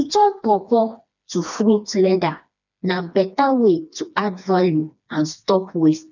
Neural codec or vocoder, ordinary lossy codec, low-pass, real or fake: codec, 16 kHz, 2 kbps, FreqCodec, smaller model; none; 7.2 kHz; fake